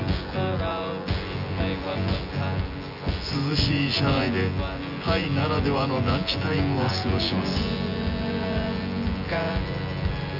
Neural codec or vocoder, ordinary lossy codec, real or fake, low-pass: vocoder, 24 kHz, 100 mel bands, Vocos; none; fake; 5.4 kHz